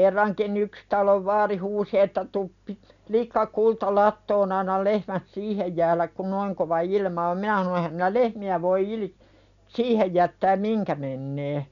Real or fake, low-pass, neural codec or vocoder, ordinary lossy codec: real; 7.2 kHz; none; none